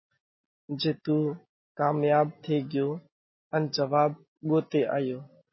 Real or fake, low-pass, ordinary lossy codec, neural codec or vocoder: real; 7.2 kHz; MP3, 24 kbps; none